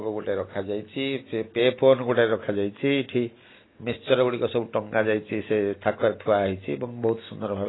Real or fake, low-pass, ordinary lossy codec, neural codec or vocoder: real; 7.2 kHz; AAC, 16 kbps; none